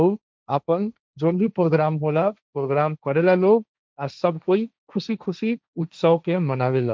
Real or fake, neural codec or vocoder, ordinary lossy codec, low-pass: fake; codec, 16 kHz, 1.1 kbps, Voila-Tokenizer; none; none